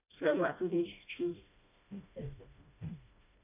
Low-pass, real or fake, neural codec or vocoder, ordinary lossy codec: 3.6 kHz; fake; codec, 16 kHz, 1 kbps, FreqCodec, smaller model; none